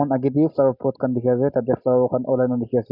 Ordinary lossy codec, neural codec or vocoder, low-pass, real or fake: none; none; 5.4 kHz; real